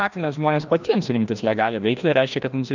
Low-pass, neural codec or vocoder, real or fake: 7.2 kHz; codec, 16 kHz, 1 kbps, FreqCodec, larger model; fake